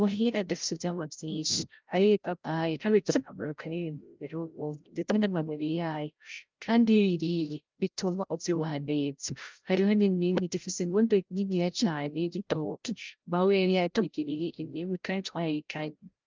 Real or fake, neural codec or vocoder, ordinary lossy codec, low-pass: fake; codec, 16 kHz, 0.5 kbps, FreqCodec, larger model; Opus, 24 kbps; 7.2 kHz